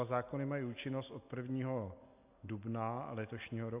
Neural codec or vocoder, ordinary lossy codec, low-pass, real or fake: none; AAC, 24 kbps; 3.6 kHz; real